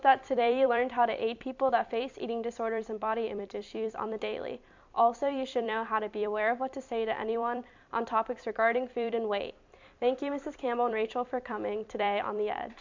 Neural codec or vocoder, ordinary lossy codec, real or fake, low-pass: vocoder, 44.1 kHz, 128 mel bands every 256 samples, BigVGAN v2; MP3, 64 kbps; fake; 7.2 kHz